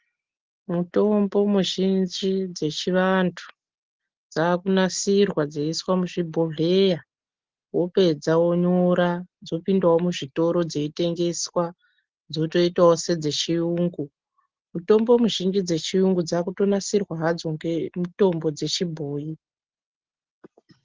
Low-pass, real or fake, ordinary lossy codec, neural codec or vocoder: 7.2 kHz; real; Opus, 16 kbps; none